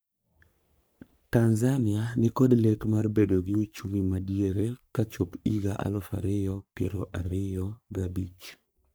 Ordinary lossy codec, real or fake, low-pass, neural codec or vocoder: none; fake; none; codec, 44.1 kHz, 3.4 kbps, Pupu-Codec